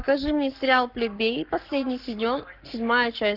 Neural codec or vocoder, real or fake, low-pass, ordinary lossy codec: codec, 44.1 kHz, 7.8 kbps, Pupu-Codec; fake; 5.4 kHz; Opus, 32 kbps